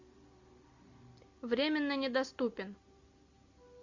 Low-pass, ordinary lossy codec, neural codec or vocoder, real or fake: 7.2 kHz; Opus, 64 kbps; none; real